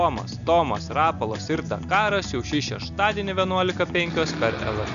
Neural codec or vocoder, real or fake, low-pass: none; real; 7.2 kHz